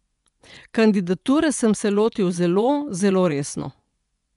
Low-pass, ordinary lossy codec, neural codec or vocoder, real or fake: 10.8 kHz; none; vocoder, 24 kHz, 100 mel bands, Vocos; fake